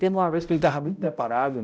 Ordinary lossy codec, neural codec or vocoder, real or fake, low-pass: none; codec, 16 kHz, 0.5 kbps, X-Codec, HuBERT features, trained on balanced general audio; fake; none